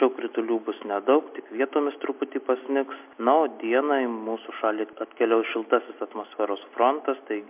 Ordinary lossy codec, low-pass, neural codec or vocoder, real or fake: MP3, 32 kbps; 3.6 kHz; none; real